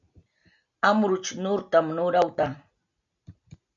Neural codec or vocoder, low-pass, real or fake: none; 7.2 kHz; real